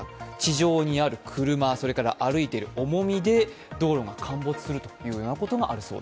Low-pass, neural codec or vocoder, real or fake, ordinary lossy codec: none; none; real; none